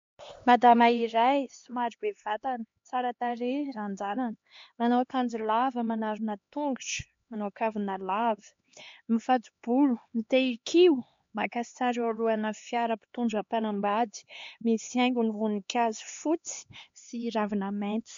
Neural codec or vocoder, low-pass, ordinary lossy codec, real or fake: codec, 16 kHz, 2 kbps, X-Codec, HuBERT features, trained on LibriSpeech; 7.2 kHz; MP3, 48 kbps; fake